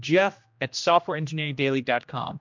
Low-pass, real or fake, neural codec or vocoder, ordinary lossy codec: 7.2 kHz; fake; codec, 16 kHz, 1 kbps, X-Codec, HuBERT features, trained on general audio; MP3, 64 kbps